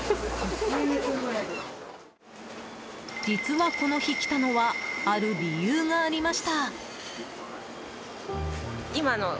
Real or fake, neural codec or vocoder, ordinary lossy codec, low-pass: real; none; none; none